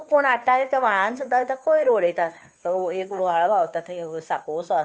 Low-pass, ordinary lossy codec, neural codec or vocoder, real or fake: none; none; codec, 16 kHz, 2 kbps, FunCodec, trained on Chinese and English, 25 frames a second; fake